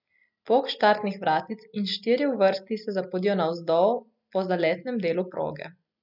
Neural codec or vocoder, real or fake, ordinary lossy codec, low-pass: none; real; none; 5.4 kHz